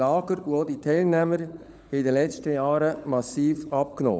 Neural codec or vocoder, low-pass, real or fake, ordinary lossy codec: codec, 16 kHz, 16 kbps, FunCodec, trained on LibriTTS, 50 frames a second; none; fake; none